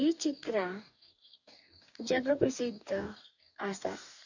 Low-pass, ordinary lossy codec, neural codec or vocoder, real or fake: 7.2 kHz; none; codec, 44.1 kHz, 2.6 kbps, DAC; fake